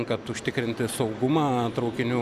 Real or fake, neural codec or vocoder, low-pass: real; none; 14.4 kHz